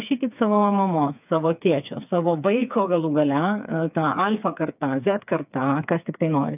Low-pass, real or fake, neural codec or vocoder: 3.6 kHz; fake; codec, 16 kHz, 4 kbps, FreqCodec, smaller model